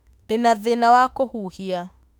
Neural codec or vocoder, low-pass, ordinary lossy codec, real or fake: autoencoder, 48 kHz, 32 numbers a frame, DAC-VAE, trained on Japanese speech; 19.8 kHz; none; fake